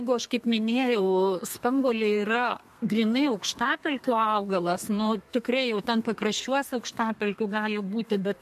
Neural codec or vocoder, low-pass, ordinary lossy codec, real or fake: codec, 32 kHz, 1.9 kbps, SNAC; 14.4 kHz; MP3, 64 kbps; fake